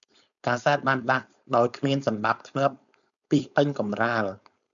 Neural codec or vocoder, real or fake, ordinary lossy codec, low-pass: codec, 16 kHz, 4.8 kbps, FACodec; fake; MP3, 96 kbps; 7.2 kHz